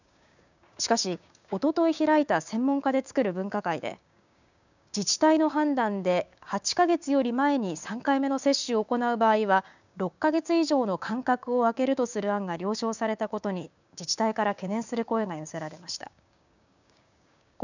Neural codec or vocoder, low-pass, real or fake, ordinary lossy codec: codec, 16 kHz, 6 kbps, DAC; 7.2 kHz; fake; none